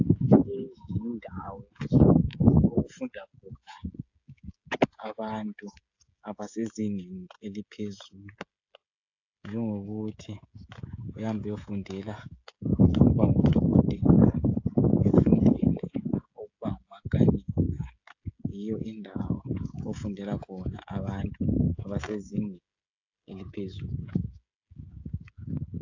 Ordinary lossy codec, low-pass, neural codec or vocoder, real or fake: AAC, 48 kbps; 7.2 kHz; codec, 16 kHz, 16 kbps, FreqCodec, smaller model; fake